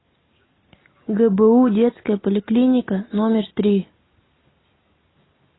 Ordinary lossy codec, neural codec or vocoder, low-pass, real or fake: AAC, 16 kbps; none; 7.2 kHz; real